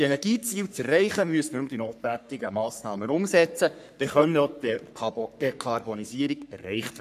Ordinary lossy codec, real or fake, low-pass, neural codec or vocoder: none; fake; 14.4 kHz; codec, 44.1 kHz, 3.4 kbps, Pupu-Codec